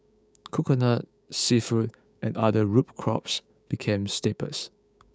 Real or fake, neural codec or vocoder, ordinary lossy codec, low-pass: fake; codec, 16 kHz, 6 kbps, DAC; none; none